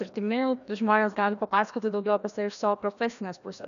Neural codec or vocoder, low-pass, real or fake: codec, 16 kHz, 1 kbps, FreqCodec, larger model; 7.2 kHz; fake